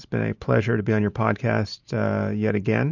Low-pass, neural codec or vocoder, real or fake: 7.2 kHz; vocoder, 44.1 kHz, 128 mel bands every 512 samples, BigVGAN v2; fake